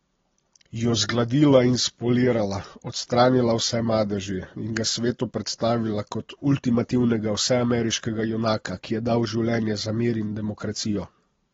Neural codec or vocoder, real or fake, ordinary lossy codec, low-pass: none; real; AAC, 24 kbps; 7.2 kHz